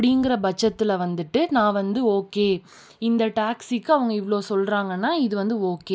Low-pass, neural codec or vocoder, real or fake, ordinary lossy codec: none; none; real; none